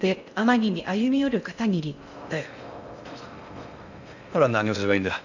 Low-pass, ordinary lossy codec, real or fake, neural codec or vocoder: 7.2 kHz; none; fake; codec, 16 kHz in and 24 kHz out, 0.6 kbps, FocalCodec, streaming, 4096 codes